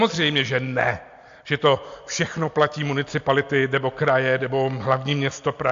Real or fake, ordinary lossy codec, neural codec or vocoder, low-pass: real; AAC, 64 kbps; none; 7.2 kHz